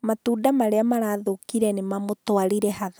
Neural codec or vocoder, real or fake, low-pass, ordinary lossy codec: none; real; none; none